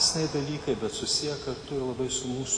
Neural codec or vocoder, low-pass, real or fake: none; 9.9 kHz; real